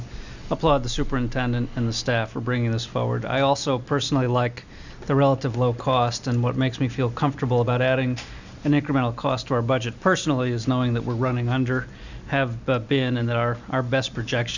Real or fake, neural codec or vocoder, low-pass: real; none; 7.2 kHz